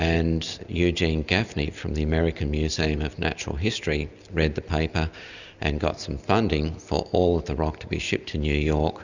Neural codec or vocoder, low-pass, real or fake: none; 7.2 kHz; real